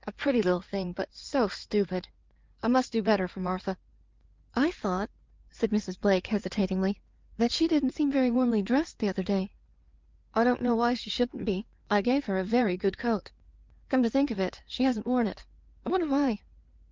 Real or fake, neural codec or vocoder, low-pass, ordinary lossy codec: fake; codec, 16 kHz in and 24 kHz out, 2.2 kbps, FireRedTTS-2 codec; 7.2 kHz; Opus, 24 kbps